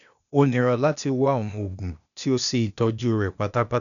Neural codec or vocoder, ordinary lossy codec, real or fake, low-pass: codec, 16 kHz, 0.8 kbps, ZipCodec; none; fake; 7.2 kHz